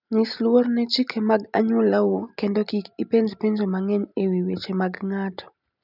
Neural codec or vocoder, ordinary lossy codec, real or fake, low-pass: none; none; real; 5.4 kHz